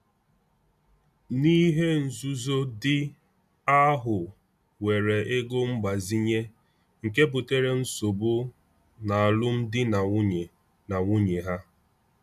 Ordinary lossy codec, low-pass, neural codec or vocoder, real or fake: none; 14.4 kHz; none; real